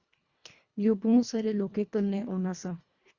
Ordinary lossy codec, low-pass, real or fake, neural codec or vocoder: Opus, 64 kbps; 7.2 kHz; fake; codec, 24 kHz, 1.5 kbps, HILCodec